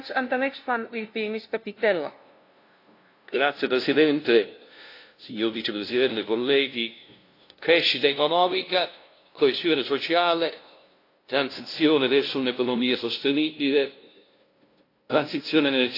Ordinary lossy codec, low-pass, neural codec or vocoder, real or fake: AAC, 32 kbps; 5.4 kHz; codec, 16 kHz, 0.5 kbps, FunCodec, trained on LibriTTS, 25 frames a second; fake